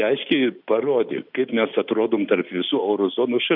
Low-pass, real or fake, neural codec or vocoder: 5.4 kHz; real; none